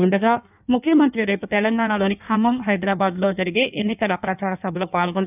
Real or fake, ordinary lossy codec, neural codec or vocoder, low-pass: fake; none; codec, 16 kHz in and 24 kHz out, 1.1 kbps, FireRedTTS-2 codec; 3.6 kHz